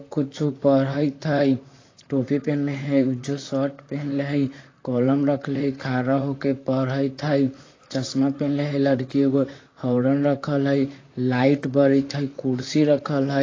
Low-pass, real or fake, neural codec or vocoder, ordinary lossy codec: 7.2 kHz; fake; vocoder, 44.1 kHz, 128 mel bands, Pupu-Vocoder; AAC, 32 kbps